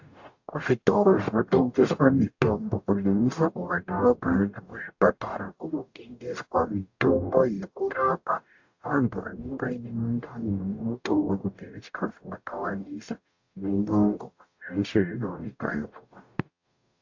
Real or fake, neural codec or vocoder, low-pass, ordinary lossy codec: fake; codec, 44.1 kHz, 0.9 kbps, DAC; 7.2 kHz; AAC, 48 kbps